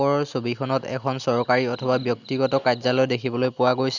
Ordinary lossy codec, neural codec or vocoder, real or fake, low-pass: none; none; real; 7.2 kHz